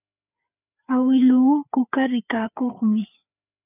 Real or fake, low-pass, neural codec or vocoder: fake; 3.6 kHz; codec, 16 kHz, 4 kbps, FreqCodec, larger model